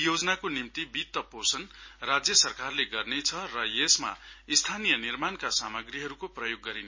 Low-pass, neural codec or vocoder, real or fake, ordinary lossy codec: 7.2 kHz; none; real; MP3, 32 kbps